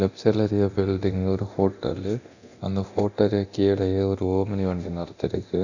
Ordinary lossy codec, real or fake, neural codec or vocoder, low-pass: none; fake; codec, 24 kHz, 0.9 kbps, DualCodec; 7.2 kHz